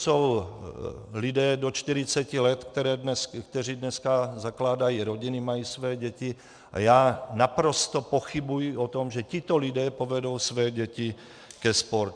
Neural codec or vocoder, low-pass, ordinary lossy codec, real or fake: vocoder, 44.1 kHz, 128 mel bands every 512 samples, BigVGAN v2; 9.9 kHz; MP3, 96 kbps; fake